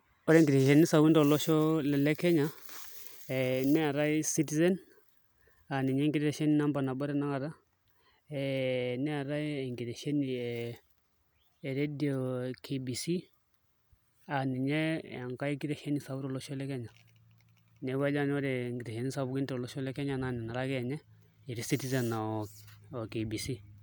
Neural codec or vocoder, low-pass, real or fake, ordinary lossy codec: none; none; real; none